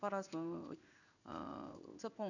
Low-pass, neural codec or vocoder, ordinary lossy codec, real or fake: 7.2 kHz; codec, 16 kHz in and 24 kHz out, 1 kbps, XY-Tokenizer; none; fake